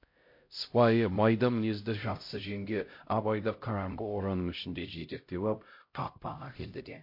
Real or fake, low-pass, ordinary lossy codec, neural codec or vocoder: fake; 5.4 kHz; MP3, 32 kbps; codec, 16 kHz, 0.5 kbps, X-Codec, HuBERT features, trained on LibriSpeech